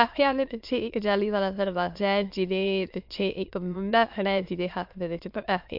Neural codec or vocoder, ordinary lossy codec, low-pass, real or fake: autoencoder, 22.05 kHz, a latent of 192 numbers a frame, VITS, trained on many speakers; MP3, 48 kbps; 5.4 kHz; fake